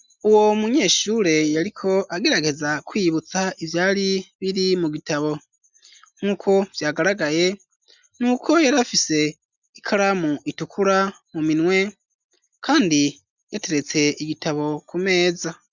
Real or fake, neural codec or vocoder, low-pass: real; none; 7.2 kHz